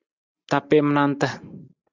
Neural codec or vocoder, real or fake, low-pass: none; real; 7.2 kHz